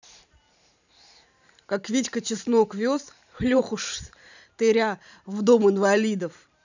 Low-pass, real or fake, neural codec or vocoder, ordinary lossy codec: 7.2 kHz; fake; vocoder, 44.1 kHz, 128 mel bands every 512 samples, BigVGAN v2; none